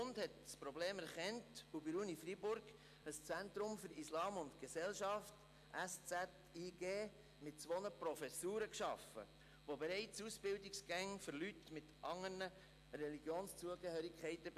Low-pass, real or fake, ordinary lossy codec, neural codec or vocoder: 14.4 kHz; real; AAC, 96 kbps; none